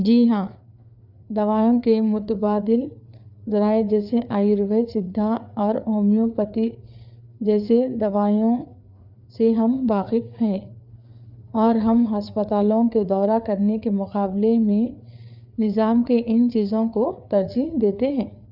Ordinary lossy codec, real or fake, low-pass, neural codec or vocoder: none; fake; 5.4 kHz; codec, 16 kHz, 4 kbps, FreqCodec, larger model